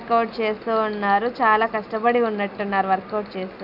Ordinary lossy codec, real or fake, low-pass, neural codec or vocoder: none; real; 5.4 kHz; none